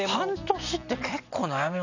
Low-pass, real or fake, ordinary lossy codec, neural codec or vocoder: 7.2 kHz; real; none; none